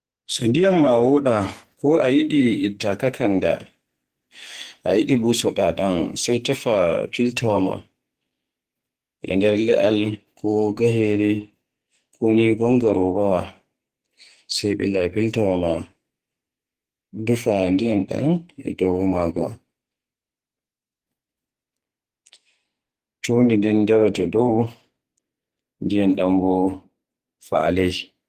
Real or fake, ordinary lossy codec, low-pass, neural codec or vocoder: fake; Opus, 24 kbps; 14.4 kHz; codec, 44.1 kHz, 2.6 kbps, SNAC